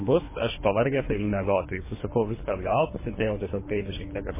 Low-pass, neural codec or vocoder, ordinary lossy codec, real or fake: 3.6 kHz; codec, 24 kHz, 3 kbps, HILCodec; MP3, 16 kbps; fake